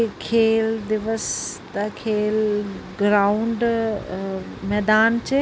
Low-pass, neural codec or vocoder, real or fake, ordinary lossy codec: none; none; real; none